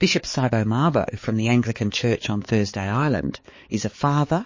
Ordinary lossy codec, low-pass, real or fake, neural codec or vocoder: MP3, 32 kbps; 7.2 kHz; fake; codec, 16 kHz, 4 kbps, X-Codec, HuBERT features, trained on balanced general audio